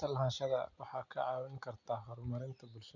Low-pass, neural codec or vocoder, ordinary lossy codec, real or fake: 7.2 kHz; none; none; real